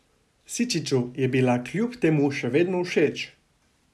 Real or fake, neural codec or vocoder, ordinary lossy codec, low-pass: real; none; none; none